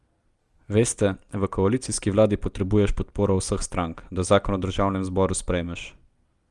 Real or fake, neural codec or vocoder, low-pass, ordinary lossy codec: real; none; 10.8 kHz; Opus, 32 kbps